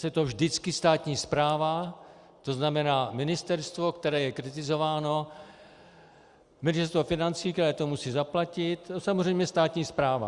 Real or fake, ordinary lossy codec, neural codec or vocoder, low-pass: real; Opus, 64 kbps; none; 10.8 kHz